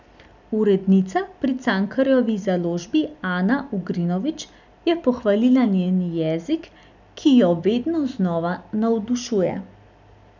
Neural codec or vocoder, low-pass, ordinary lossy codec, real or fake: none; 7.2 kHz; none; real